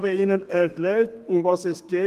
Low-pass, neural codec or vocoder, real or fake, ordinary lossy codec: 14.4 kHz; codec, 32 kHz, 1.9 kbps, SNAC; fake; Opus, 24 kbps